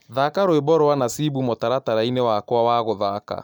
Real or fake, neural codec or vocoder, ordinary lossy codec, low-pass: real; none; none; 19.8 kHz